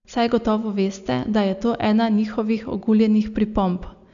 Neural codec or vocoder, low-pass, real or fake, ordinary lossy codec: none; 7.2 kHz; real; none